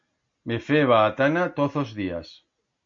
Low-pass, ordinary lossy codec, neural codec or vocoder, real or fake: 7.2 kHz; MP3, 48 kbps; none; real